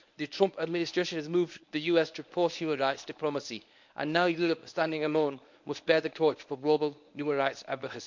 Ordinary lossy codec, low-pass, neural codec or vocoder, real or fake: MP3, 64 kbps; 7.2 kHz; codec, 24 kHz, 0.9 kbps, WavTokenizer, medium speech release version 1; fake